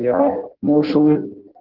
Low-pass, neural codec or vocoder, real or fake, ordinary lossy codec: 5.4 kHz; codec, 16 kHz in and 24 kHz out, 0.6 kbps, FireRedTTS-2 codec; fake; Opus, 16 kbps